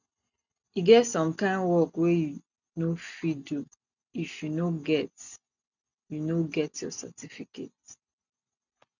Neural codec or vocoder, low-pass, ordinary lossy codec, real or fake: none; 7.2 kHz; none; real